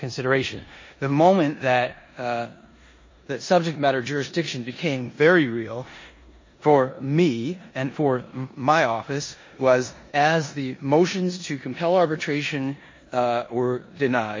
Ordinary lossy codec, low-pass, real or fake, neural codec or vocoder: MP3, 32 kbps; 7.2 kHz; fake; codec, 16 kHz in and 24 kHz out, 0.9 kbps, LongCat-Audio-Codec, four codebook decoder